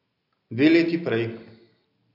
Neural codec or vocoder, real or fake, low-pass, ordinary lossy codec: none; real; 5.4 kHz; none